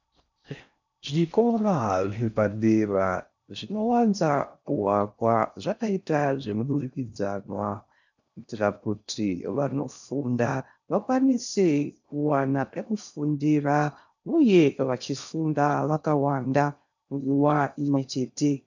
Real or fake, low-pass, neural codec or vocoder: fake; 7.2 kHz; codec, 16 kHz in and 24 kHz out, 0.6 kbps, FocalCodec, streaming, 4096 codes